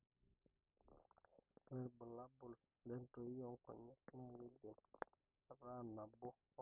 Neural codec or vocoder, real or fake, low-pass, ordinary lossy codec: none; real; 3.6 kHz; none